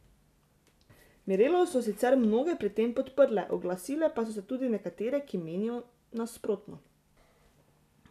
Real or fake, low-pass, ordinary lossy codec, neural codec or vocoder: real; 14.4 kHz; none; none